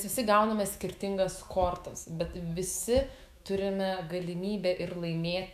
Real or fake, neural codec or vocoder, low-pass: fake; autoencoder, 48 kHz, 128 numbers a frame, DAC-VAE, trained on Japanese speech; 14.4 kHz